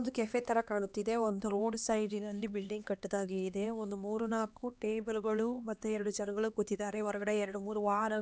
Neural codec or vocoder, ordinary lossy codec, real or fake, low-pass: codec, 16 kHz, 2 kbps, X-Codec, HuBERT features, trained on LibriSpeech; none; fake; none